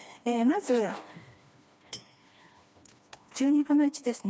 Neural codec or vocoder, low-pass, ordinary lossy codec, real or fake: codec, 16 kHz, 2 kbps, FreqCodec, smaller model; none; none; fake